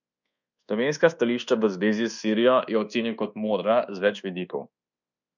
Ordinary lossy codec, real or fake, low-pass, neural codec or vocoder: none; fake; 7.2 kHz; codec, 24 kHz, 1.2 kbps, DualCodec